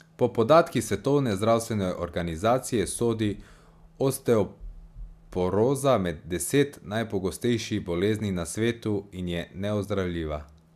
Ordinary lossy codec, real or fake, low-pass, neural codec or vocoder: AAC, 96 kbps; real; 14.4 kHz; none